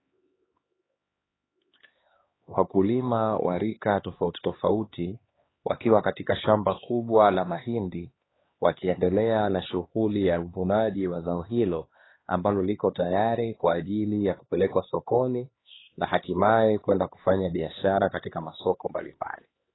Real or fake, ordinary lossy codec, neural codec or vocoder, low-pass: fake; AAC, 16 kbps; codec, 16 kHz, 2 kbps, X-Codec, HuBERT features, trained on LibriSpeech; 7.2 kHz